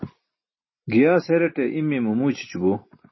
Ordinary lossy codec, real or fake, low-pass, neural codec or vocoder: MP3, 24 kbps; real; 7.2 kHz; none